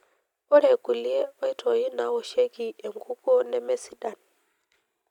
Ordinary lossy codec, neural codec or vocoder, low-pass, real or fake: none; none; 19.8 kHz; real